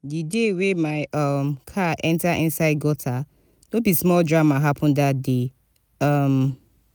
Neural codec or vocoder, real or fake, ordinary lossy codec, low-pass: none; real; none; none